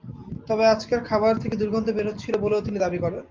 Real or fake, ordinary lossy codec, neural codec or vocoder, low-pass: real; Opus, 32 kbps; none; 7.2 kHz